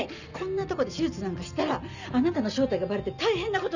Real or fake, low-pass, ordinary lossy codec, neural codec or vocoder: real; 7.2 kHz; none; none